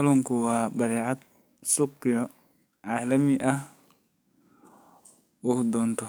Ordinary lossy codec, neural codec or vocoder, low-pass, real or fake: none; codec, 44.1 kHz, 7.8 kbps, DAC; none; fake